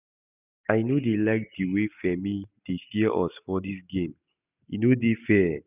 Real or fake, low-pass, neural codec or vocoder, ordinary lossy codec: real; 3.6 kHz; none; none